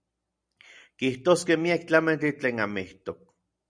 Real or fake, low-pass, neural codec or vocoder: real; 9.9 kHz; none